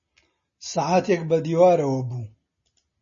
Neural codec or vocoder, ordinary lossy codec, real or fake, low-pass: none; MP3, 32 kbps; real; 7.2 kHz